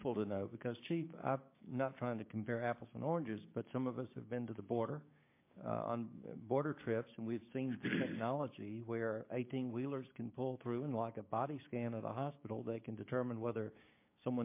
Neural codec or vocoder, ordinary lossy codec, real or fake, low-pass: codec, 16 kHz, 6 kbps, DAC; MP3, 24 kbps; fake; 3.6 kHz